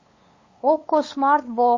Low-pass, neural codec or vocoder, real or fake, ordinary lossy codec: 7.2 kHz; codec, 16 kHz, 8 kbps, FunCodec, trained on LibriTTS, 25 frames a second; fake; MP3, 32 kbps